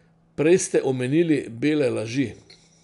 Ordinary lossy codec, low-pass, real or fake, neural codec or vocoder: none; 10.8 kHz; real; none